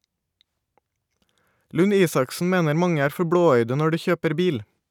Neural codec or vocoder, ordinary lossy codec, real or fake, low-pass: none; none; real; 19.8 kHz